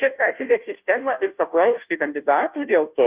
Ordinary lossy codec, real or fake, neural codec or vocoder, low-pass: Opus, 24 kbps; fake; codec, 16 kHz, 0.5 kbps, FunCodec, trained on Chinese and English, 25 frames a second; 3.6 kHz